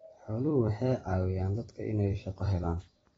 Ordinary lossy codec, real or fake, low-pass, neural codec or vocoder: AAC, 24 kbps; fake; 19.8 kHz; vocoder, 44.1 kHz, 128 mel bands every 256 samples, BigVGAN v2